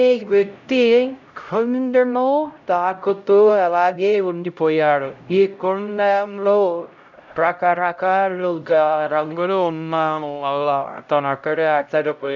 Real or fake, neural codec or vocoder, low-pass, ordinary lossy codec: fake; codec, 16 kHz, 0.5 kbps, X-Codec, HuBERT features, trained on LibriSpeech; 7.2 kHz; none